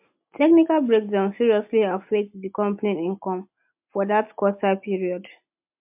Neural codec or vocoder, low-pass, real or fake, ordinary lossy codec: none; 3.6 kHz; real; MP3, 32 kbps